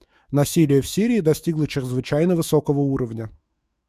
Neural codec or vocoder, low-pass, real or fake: autoencoder, 48 kHz, 128 numbers a frame, DAC-VAE, trained on Japanese speech; 14.4 kHz; fake